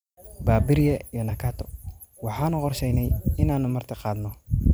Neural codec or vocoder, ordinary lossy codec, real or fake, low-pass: vocoder, 44.1 kHz, 128 mel bands every 256 samples, BigVGAN v2; none; fake; none